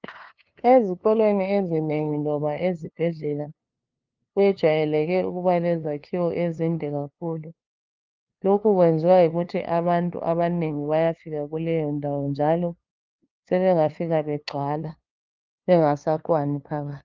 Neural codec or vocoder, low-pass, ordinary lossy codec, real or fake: codec, 16 kHz, 2 kbps, FunCodec, trained on LibriTTS, 25 frames a second; 7.2 kHz; Opus, 24 kbps; fake